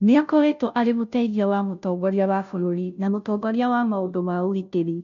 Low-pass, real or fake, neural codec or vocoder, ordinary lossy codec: 7.2 kHz; fake; codec, 16 kHz, 0.5 kbps, FunCodec, trained on Chinese and English, 25 frames a second; none